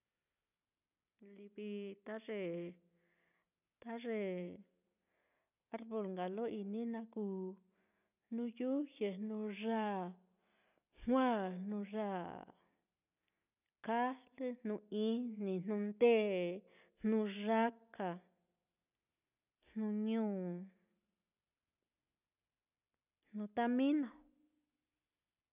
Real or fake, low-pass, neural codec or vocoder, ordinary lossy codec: real; 3.6 kHz; none; none